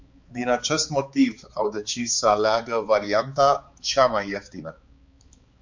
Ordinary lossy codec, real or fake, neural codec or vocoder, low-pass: MP3, 48 kbps; fake; codec, 16 kHz, 4 kbps, X-Codec, HuBERT features, trained on balanced general audio; 7.2 kHz